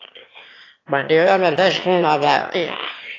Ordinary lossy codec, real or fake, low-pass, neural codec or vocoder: AAC, 32 kbps; fake; 7.2 kHz; autoencoder, 22.05 kHz, a latent of 192 numbers a frame, VITS, trained on one speaker